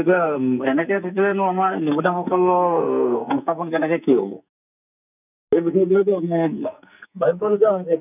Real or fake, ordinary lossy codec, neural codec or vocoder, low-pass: fake; none; codec, 44.1 kHz, 2.6 kbps, SNAC; 3.6 kHz